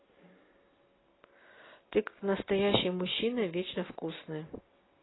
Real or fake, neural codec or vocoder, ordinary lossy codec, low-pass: real; none; AAC, 16 kbps; 7.2 kHz